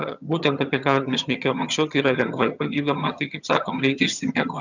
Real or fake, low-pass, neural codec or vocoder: fake; 7.2 kHz; vocoder, 22.05 kHz, 80 mel bands, HiFi-GAN